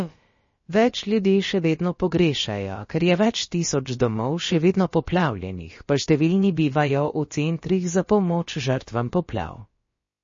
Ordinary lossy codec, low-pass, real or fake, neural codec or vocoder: MP3, 32 kbps; 7.2 kHz; fake; codec, 16 kHz, about 1 kbps, DyCAST, with the encoder's durations